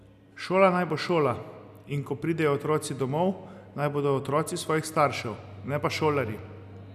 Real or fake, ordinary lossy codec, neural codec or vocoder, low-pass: real; none; none; 14.4 kHz